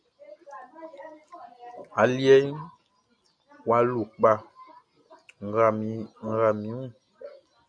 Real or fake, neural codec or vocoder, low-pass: real; none; 9.9 kHz